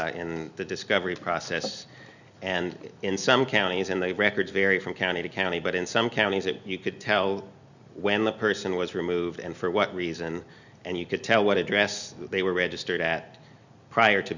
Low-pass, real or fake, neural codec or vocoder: 7.2 kHz; real; none